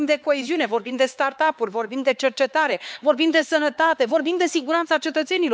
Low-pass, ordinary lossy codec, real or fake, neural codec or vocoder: none; none; fake; codec, 16 kHz, 4 kbps, X-Codec, HuBERT features, trained on LibriSpeech